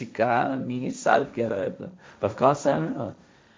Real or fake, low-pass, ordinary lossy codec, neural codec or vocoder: fake; none; none; codec, 16 kHz, 1.1 kbps, Voila-Tokenizer